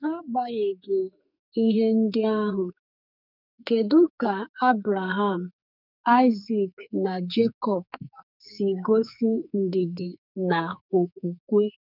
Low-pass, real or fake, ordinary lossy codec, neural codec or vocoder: 5.4 kHz; fake; none; codec, 44.1 kHz, 2.6 kbps, SNAC